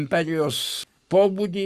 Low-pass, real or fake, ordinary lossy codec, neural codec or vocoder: 14.4 kHz; fake; Opus, 64 kbps; codec, 44.1 kHz, 7.8 kbps, Pupu-Codec